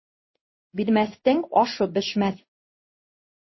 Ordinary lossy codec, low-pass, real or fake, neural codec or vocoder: MP3, 24 kbps; 7.2 kHz; fake; codec, 16 kHz in and 24 kHz out, 1 kbps, XY-Tokenizer